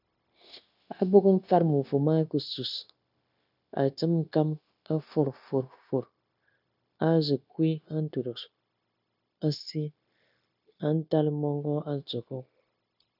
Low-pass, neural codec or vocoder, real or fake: 5.4 kHz; codec, 16 kHz, 0.9 kbps, LongCat-Audio-Codec; fake